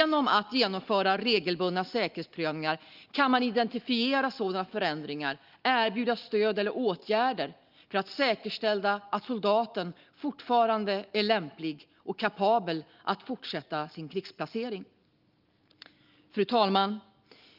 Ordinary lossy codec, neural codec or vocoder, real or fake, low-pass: Opus, 32 kbps; none; real; 5.4 kHz